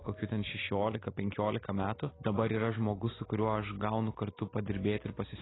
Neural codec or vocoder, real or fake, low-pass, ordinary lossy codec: none; real; 7.2 kHz; AAC, 16 kbps